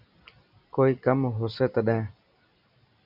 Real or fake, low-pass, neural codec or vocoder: real; 5.4 kHz; none